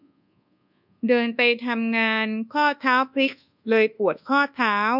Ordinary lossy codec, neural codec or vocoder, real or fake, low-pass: none; codec, 24 kHz, 1.2 kbps, DualCodec; fake; 5.4 kHz